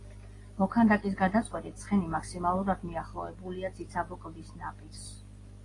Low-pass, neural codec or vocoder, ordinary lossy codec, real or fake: 10.8 kHz; none; AAC, 32 kbps; real